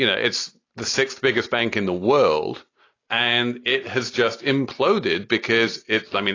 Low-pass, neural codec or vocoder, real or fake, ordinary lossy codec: 7.2 kHz; none; real; AAC, 32 kbps